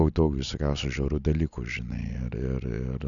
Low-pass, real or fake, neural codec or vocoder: 7.2 kHz; real; none